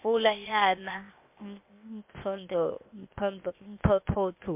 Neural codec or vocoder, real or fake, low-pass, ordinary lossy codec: codec, 16 kHz, 0.8 kbps, ZipCodec; fake; 3.6 kHz; MP3, 32 kbps